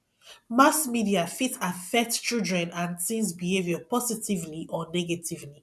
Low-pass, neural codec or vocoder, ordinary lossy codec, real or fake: none; none; none; real